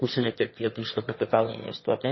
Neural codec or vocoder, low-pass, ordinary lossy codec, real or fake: autoencoder, 22.05 kHz, a latent of 192 numbers a frame, VITS, trained on one speaker; 7.2 kHz; MP3, 24 kbps; fake